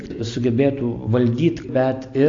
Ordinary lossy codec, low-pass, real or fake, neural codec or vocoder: AAC, 48 kbps; 7.2 kHz; real; none